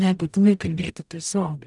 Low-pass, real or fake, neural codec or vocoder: 10.8 kHz; fake; codec, 44.1 kHz, 0.9 kbps, DAC